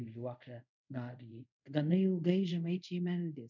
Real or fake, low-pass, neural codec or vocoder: fake; 7.2 kHz; codec, 24 kHz, 0.5 kbps, DualCodec